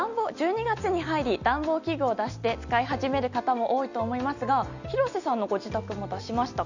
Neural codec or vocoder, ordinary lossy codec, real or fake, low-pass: none; none; real; 7.2 kHz